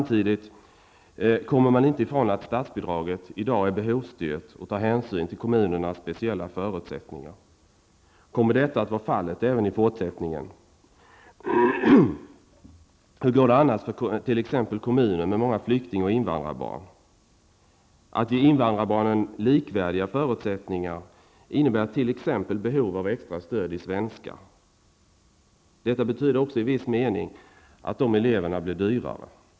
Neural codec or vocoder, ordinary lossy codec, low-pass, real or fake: none; none; none; real